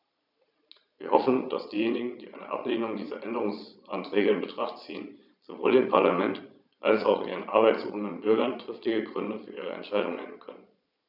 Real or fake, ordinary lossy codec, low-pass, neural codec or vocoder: fake; none; 5.4 kHz; vocoder, 22.05 kHz, 80 mel bands, Vocos